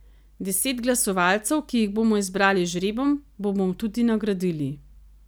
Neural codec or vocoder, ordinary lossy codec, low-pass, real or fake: none; none; none; real